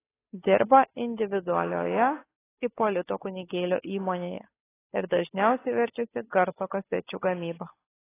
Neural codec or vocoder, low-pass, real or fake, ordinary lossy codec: codec, 16 kHz, 8 kbps, FunCodec, trained on Chinese and English, 25 frames a second; 3.6 kHz; fake; AAC, 16 kbps